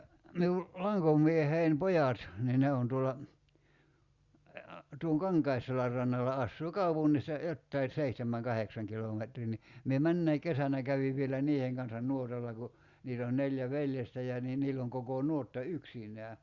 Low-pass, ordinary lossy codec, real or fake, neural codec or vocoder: 7.2 kHz; Opus, 64 kbps; real; none